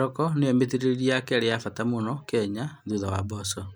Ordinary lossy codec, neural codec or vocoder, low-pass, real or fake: none; none; none; real